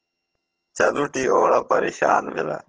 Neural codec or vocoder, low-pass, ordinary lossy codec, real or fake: vocoder, 22.05 kHz, 80 mel bands, HiFi-GAN; 7.2 kHz; Opus, 16 kbps; fake